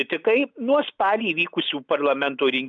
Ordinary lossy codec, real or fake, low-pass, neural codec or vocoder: MP3, 96 kbps; real; 7.2 kHz; none